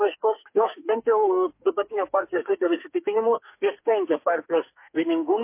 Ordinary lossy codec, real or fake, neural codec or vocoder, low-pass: MP3, 24 kbps; fake; codec, 44.1 kHz, 2.6 kbps, SNAC; 3.6 kHz